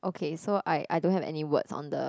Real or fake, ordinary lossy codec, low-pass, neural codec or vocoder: real; none; none; none